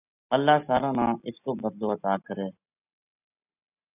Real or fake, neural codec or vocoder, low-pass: real; none; 3.6 kHz